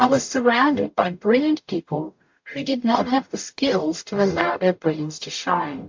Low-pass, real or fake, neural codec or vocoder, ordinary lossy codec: 7.2 kHz; fake; codec, 44.1 kHz, 0.9 kbps, DAC; MP3, 48 kbps